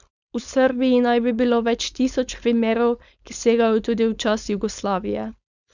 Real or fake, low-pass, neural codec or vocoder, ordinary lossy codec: fake; 7.2 kHz; codec, 16 kHz, 4.8 kbps, FACodec; none